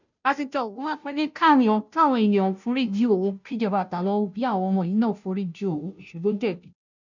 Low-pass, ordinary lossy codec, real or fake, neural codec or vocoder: 7.2 kHz; none; fake; codec, 16 kHz, 0.5 kbps, FunCodec, trained on Chinese and English, 25 frames a second